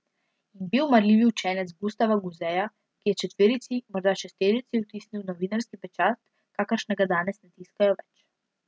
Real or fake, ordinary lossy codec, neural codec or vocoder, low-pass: real; none; none; none